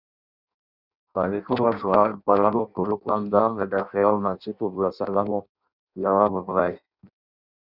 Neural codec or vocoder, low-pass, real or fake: codec, 16 kHz in and 24 kHz out, 0.6 kbps, FireRedTTS-2 codec; 5.4 kHz; fake